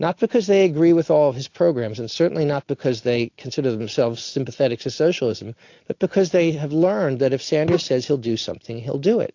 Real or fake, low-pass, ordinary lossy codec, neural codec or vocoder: real; 7.2 kHz; AAC, 48 kbps; none